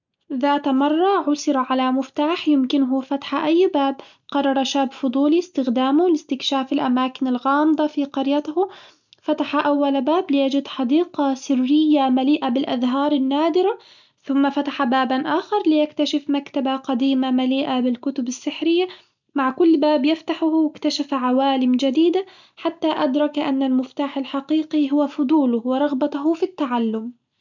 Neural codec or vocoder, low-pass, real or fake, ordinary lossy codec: none; 7.2 kHz; real; none